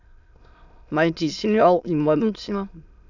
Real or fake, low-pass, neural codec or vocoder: fake; 7.2 kHz; autoencoder, 22.05 kHz, a latent of 192 numbers a frame, VITS, trained on many speakers